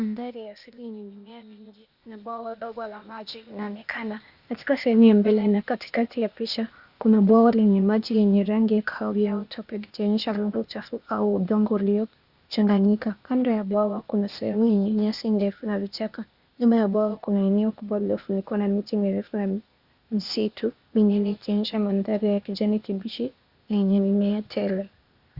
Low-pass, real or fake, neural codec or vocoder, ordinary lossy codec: 5.4 kHz; fake; codec, 16 kHz, 0.8 kbps, ZipCodec; Opus, 64 kbps